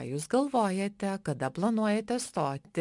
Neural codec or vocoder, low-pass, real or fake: none; 10.8 kHz; real